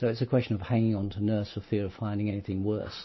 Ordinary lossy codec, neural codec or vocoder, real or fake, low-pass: MP3, 24 kbps; none; real; 7.2 kHz